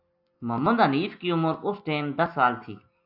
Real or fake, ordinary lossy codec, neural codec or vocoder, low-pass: real; AAC, 48 kbps; none; 5.4 kHz